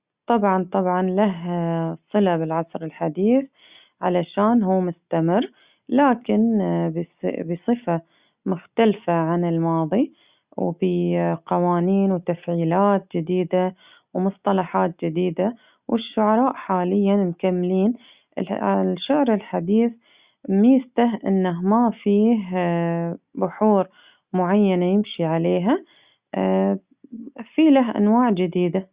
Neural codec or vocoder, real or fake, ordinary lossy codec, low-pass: none; real; Opus, 64 kbps; 3.6 kHz